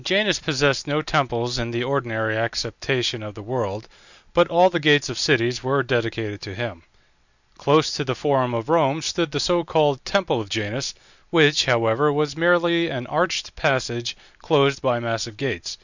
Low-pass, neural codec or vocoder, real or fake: 7.2 kHz; none; real